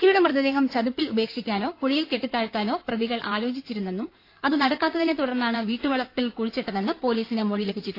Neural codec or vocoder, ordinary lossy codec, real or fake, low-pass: codec, 16 kHz in and 24 kHz out, 2.2 kbps, FireRedTTS-2 codec; AAC, 32 kbps; fake; 5.4 kHz